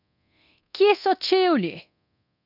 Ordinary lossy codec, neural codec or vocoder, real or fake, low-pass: none; codec, 24 kHz, 0.9 kbps, DualCodec; fake; 5.4 kHz